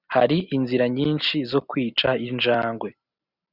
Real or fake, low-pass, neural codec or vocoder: real; 5.4 kHz; none